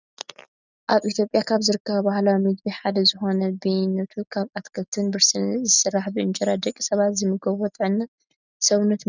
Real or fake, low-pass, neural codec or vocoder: real; 7.2 kHz; none